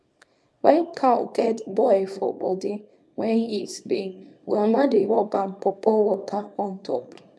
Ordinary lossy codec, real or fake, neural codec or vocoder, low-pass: none; fake; codec, 24 kHz, 0.9 kbps, WavTokenizer, small release; none